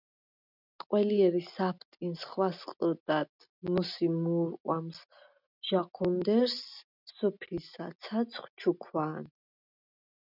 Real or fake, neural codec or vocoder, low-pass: real; none; 5.4 kHz